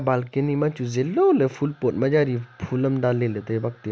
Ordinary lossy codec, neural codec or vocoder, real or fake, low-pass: none; none; real; none